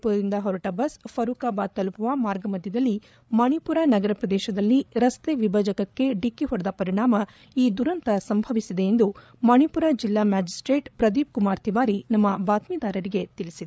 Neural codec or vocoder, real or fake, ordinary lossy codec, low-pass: codec, 16 kHz, 8 kbps, FreqCodec, larger model; fake; none; none